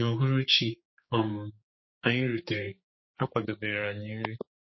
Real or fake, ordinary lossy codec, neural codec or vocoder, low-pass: fake; MP3, 24 kbps; codec, 16 kHz, 4 kbps, X-Codec, HuBERT features, trained on general audio; 7.2 kHz